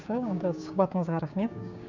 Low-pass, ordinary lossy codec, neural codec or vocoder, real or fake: 7.2 kHz; none; codec, 16 kHz, 6 kbps, DAC; fake